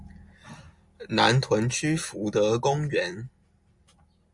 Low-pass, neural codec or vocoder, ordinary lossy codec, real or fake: 10.8 kHz; none; Opus, 64 kbps; real